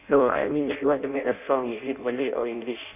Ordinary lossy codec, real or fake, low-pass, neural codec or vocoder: none; fake; 3.6 kHz; codec, 16 kHz in and 24 kHz out, 0.6 kbps, FireRedTTS-2 codec